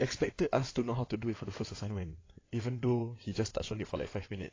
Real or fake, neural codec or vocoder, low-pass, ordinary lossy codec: fake; codec, 16 kHz in and 24 kHz out, 2.2 kbps, FireRedTTS-2 codec; 7.2 kHz; AAC, 32 kbps